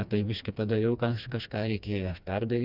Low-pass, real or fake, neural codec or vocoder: 5.4 kHz; fake; codec, 16 kHz, 2 kbps, FreqCodec, smaller model